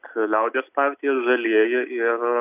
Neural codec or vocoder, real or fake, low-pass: none; real; 3.6 kHz